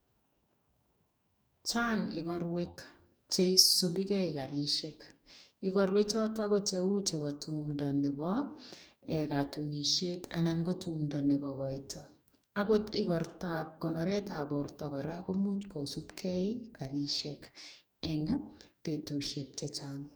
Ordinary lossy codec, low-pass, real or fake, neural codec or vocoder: none; none; fake; codec, 44.1 kHz, 2.6 kbps, DAC